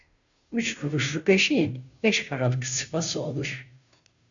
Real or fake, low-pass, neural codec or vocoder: fake; 7.2 kHz; codec, 16 kHz, 0.5 kbps, FunCodec, trained on Chinese and English, 25 frames a second